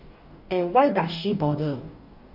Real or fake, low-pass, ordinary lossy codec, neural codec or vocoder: fake; 5.4 kHz; Opus, 64 kbps; codec, 44.1 kHz, 2.6 kbps, DAC